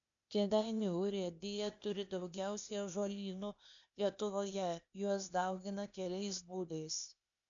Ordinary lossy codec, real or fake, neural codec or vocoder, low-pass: MP3, 96 kbps; fake; codec, 16 kHz, 0.8 kbps, ZipCodec; 7.2 kHz